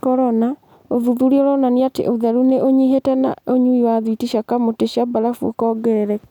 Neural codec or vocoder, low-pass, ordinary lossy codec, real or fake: none; 19.8 kHz; none; real